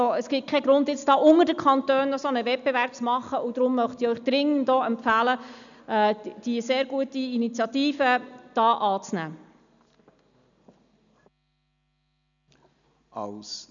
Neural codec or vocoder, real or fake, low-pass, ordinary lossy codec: none; real; 7.2 kHz; none